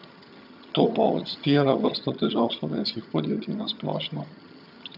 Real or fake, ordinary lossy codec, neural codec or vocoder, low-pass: fake; none; vocoder, 22.05 kHz, 80 mel bands, HiFi-GAN; 5.4 kHz